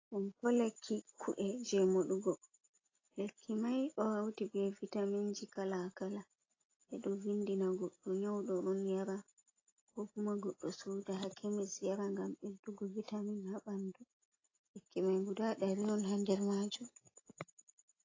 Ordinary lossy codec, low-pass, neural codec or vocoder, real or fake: AAC, 32 kbps; 7.2 kHz; none; real